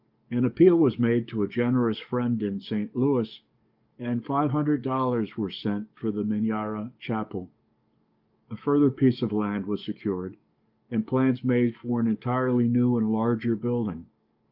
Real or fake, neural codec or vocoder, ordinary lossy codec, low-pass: fake; codec, 44.1 kHz, 7.8 kbps, DAC; Opus, 24 kbps; 5.4 kHz